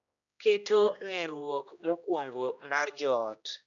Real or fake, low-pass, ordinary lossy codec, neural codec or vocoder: fake; 7.2 kHz; none; codec, 16 kHz, 1 kbps, X-Codec, HuBERT features, trained on general audio